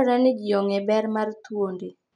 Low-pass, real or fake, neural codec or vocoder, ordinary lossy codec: 9.9 kHz; real; none; MP3, 96 kbps